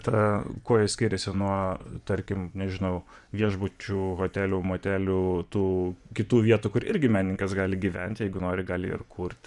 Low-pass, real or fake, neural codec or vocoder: 10.8 kHz; fake; vocoder, 24 kHz, 100 mel bands, Vocos